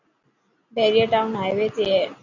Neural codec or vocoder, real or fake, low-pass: none; real; 7.2 kHz